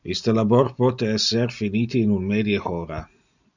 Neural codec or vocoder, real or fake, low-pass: none; real; 7.2 kHz